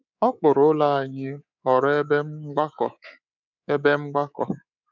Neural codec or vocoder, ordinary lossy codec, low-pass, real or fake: codec, 24 kHz, 3.1 kbps, DualCodec; none; 7.2 kHz; fake